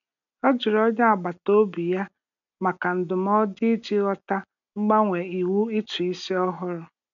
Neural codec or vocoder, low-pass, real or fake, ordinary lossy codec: none; 7.2 kHz; real; none